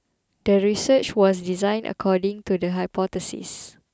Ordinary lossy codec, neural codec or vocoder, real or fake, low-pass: none; none; real; none